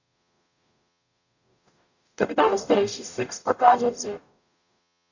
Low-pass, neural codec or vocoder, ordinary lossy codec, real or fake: 7.2 kHz; codec, 44.1 kHz, 0.9 kbps, DAC; none; fake